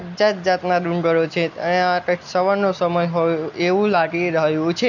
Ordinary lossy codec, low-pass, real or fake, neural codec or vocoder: none; 7.2 kHz; real; none